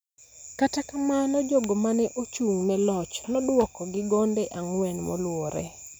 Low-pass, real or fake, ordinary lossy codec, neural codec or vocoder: none; real; none; none